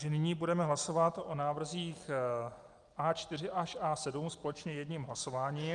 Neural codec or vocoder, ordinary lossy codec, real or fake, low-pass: none; Opus, 32 kbps; real; 10.8 kHz